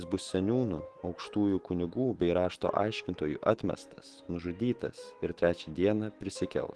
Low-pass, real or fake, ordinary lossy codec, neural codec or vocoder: 10.8 kHz; fake; Opus, 16 kbps; autoencoder, 48 kHz, 128 numbers a frame, DAC-VAE, trained on Japanese speech